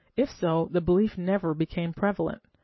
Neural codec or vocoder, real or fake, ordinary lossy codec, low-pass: none; real; MP3, 24 kbps; 7.2 kHz